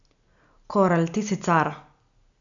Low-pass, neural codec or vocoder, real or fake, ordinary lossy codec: 7.2 kHz; none; real; none